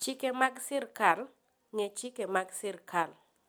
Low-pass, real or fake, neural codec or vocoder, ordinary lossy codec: none; real; none; none